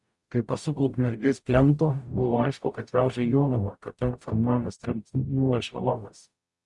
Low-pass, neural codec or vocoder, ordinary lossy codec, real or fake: 10.8 kHz; codec, 44.1 kHz, 0.9 kbps, DAC; Opus, 64 kbps; fake